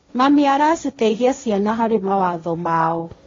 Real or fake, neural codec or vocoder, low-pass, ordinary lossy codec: fake; codec, 16 kHz, 1.1 kbps, Voila-Tokenizer; 7.2 kHz; AAC, 32 kbps